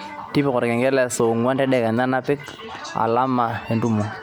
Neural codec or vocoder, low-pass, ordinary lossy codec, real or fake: none; none; none; real